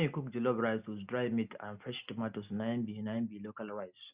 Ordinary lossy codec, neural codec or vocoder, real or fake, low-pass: Opus, 64 kbps; none; real; 3.6 kHz